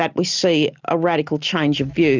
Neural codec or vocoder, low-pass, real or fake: none; 7.2 kHz; real